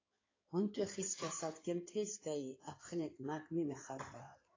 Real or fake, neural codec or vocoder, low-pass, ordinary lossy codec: fake; codec, 16 kHz in and 24 kHz out, 2.2 kbps, FireRedTTS-2 codec; 7.2 kHz; AAC, 32 kbps